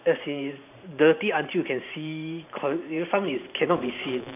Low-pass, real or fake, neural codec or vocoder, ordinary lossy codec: 3.6 kHz; fake; vocoder, 44.1 kHz, 128 mel bands every 512 samples, BigVGAN v2; AAC, 32 kbps